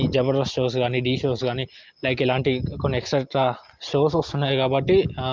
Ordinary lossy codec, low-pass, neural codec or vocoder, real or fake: Opus, 32 kbps; 7.2 kHz; none; real